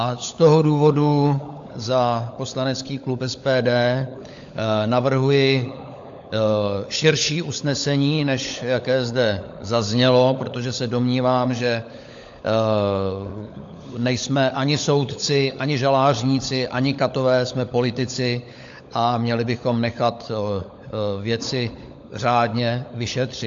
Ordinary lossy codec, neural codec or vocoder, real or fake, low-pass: AAC, 64 kbps; codec, 16 kHz, 16 kbps, FunCodec, trained on LibriTTS, 50 frames a second; fake; 7.2 kHz